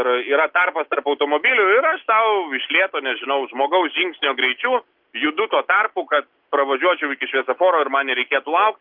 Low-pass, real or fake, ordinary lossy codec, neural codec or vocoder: 5.4 kHz; real; Opus, 32 kbps; none